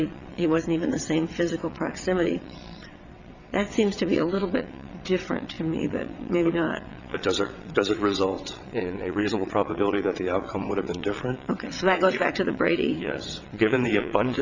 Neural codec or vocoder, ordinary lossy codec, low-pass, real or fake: vocoder, 22.05 kHz, 80 mel bands, WaveNeXt; Opus, 64 kbps; 7.2 kHz; fake